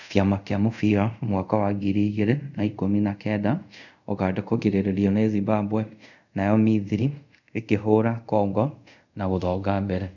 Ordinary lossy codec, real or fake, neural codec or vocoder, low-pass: none; fake; codec, 24 kHz, 0.5 kbps, DualCodec; 7.2 kHz